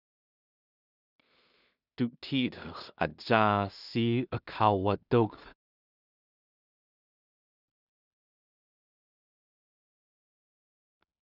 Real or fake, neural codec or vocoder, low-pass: fake; codec, 16 kHz in and 24 kHz out, 0.4 kbps, LongCat-Audio-Codec, two codebook decoder; 5.4 kHz